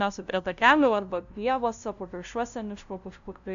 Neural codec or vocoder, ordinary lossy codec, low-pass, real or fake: codec, 16 kHz, 0.5 kbps, FunCodec, trained on LibriTTS, 25 frames a second; AAC, 64 kbps; 7.2 kHz; fake